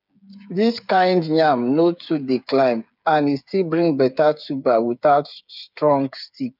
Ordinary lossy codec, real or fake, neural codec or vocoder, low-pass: none; fake; codec, 16 kHz, 8 kbps, FreqCodec, smaller model; 5.4 kHz